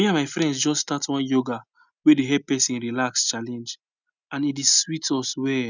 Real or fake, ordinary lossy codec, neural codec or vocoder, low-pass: real; none; none; 7.2 kHz